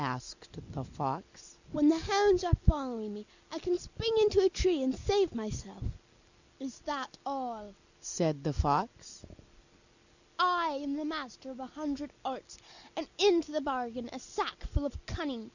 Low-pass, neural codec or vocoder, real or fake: 7.2 kHz; none; real